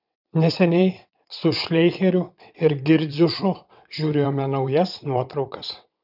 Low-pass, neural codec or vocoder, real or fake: 5.4 kHz; vocoder, 22.05 kHz, 80 mel bands, WaveNeXt; fake